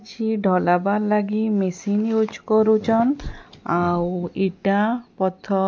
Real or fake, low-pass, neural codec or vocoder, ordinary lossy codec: real; none; none; none